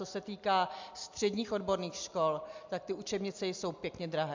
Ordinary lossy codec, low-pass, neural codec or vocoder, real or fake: MP3, 64 kbps; 7.2 kHz; none; real